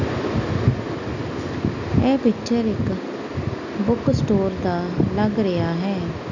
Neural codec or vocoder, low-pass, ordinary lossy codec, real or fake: none; 7.2 kHz; none; real